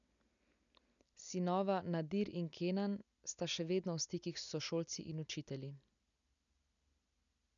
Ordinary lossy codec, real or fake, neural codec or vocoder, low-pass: none; real; none; 7.2 kHz